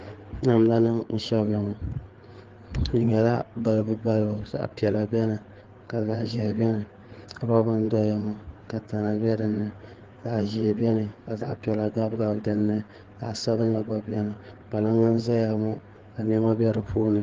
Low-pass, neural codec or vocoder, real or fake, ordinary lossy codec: 7.2 kHz; codec, 16 kHz, 4 kbps, FreqCodec, larger model; fake; Opus, 16 kbps